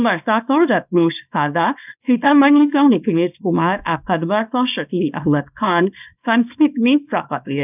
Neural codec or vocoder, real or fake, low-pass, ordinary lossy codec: codec, 24 kHz, 0.9 kbps, WavTokenizer, small release; fake; 3.6 kHz; none